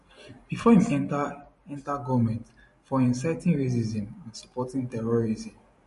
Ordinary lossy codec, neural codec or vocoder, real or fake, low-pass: MP3, 48 kbps; vocoder, 44.1 kHz, 128 mel bands every 512 samples, BigVGAN v2; fake; 14.4 kHz